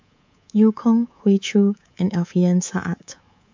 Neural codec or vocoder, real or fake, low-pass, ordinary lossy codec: codec, 24 kHz, 3.1 kbps, DualCodec; fake; 7.2 kHz; MP3, 64 kbps